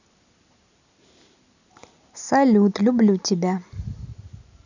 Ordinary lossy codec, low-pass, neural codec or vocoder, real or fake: none; 7.2 kHz; none; real